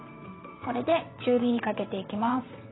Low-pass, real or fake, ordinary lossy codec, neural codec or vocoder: 7.2 kHz; fake; AAC, 16 kbps; vocoder, 22.05 kHz, 80 mel bands, WaveNeXt